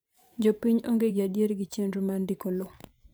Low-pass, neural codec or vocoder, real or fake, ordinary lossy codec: none; vocoder, 44.1 kHz, 128 mel bands every 512 samples, BigVGAN v2; fake; none